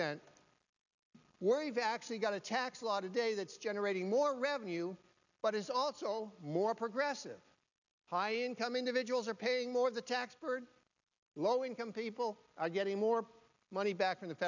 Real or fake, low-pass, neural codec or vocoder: real; 7.2 kHz; none